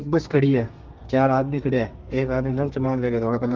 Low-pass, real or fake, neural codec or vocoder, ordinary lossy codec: 7.2 kHz; fake; codec, 32 kHz, 1.9 kbps, SNAC; Opus, 32 kbps